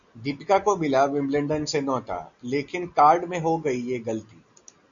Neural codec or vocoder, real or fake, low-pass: none; real; 7.2 kHz